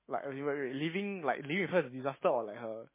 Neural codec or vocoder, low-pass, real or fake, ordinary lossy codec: autoencoder, 48 kHz, 128 numbers a frame, DAC-VAE, trained on Japanese speech; 3.6 kHz; fake; MP3, 16 kbps